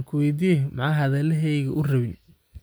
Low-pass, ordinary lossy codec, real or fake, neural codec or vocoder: none; none; real; none